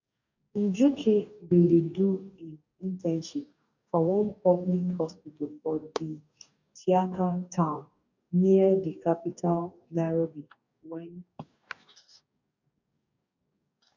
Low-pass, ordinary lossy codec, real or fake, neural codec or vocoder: 7.2 kHz; none; fake; codec, 44.1 kHz, 2.6 kbps, DAC